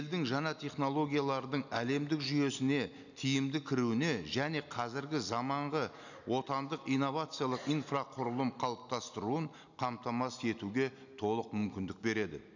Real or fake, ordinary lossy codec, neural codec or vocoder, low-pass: real; none; none; 7.2 kHz